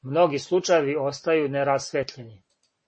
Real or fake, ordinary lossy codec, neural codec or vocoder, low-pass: real; MP3, 32 kbps; none; 9.9 kHz